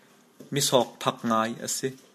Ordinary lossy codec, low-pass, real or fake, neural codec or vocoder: MP3, 64 kbps; 14.4 kHz; real; none